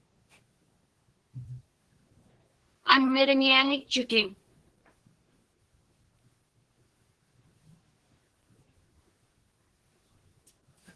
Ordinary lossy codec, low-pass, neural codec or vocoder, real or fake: Opus, 16 kbps; 10.8 kHz; codec, 24 kHz, 1 kbps, SNAC; fake